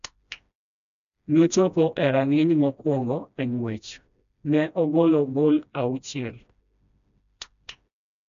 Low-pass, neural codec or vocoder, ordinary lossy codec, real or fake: 7.2 kHz; codec, 16 kHz, 1 kbps, FreqCodec, smaller model; AAC, 96 kbps; fake